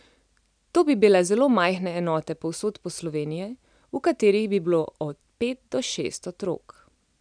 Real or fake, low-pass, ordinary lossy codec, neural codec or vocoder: real; 9.9 kHz; none; none